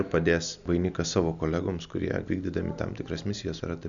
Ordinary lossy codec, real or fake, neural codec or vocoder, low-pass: MP3, 96 kbps; real; none; 7.2 kHz